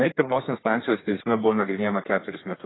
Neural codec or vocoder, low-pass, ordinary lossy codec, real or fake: codec, 32 kHz, 1.9 kbps, SNAC; 7.2 kHz; AAC, 16 kbps; fake